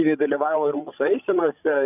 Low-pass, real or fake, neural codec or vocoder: 3.6 kHz; fake; codec, 16 kHz, 16 kbps, FreqCodec, larger model